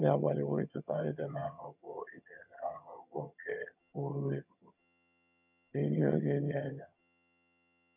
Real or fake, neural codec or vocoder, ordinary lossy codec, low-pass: fake; vocoder, 22.05 kHz, 80 mel bands, HiFi-GAN; none; 3.6 kHz